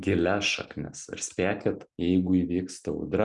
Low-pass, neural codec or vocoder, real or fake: 10.8 kHz; none; real